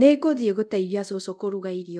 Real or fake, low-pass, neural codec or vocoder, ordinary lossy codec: fake; none; codec, 24 kHz, 0.5 kbps, DualCodec; none